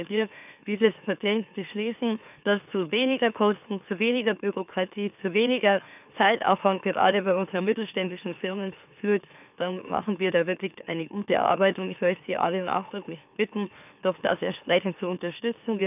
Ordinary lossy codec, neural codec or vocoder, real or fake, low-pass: none; autoencoder, 44.1 kHz, a latent of 192 numbers a frame, MeloTTS; fake; 3.6 kHz